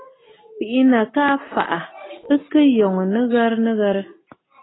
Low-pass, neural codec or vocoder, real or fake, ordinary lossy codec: 7.2 kHz; none; real; AAC, 16 kbps